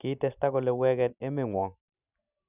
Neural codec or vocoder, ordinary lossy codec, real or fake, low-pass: none; none; real; 3.6 kHz